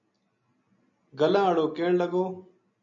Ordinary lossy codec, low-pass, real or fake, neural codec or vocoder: MP3, 96 kbps; 7.2 kHz; real; none